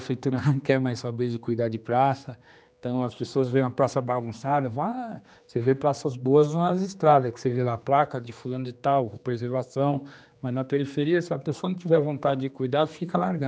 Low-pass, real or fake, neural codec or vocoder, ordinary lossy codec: none; fake; codec, 16 kHz, 2 kbps, X-Codec, HuBERT features, trained on general audio; none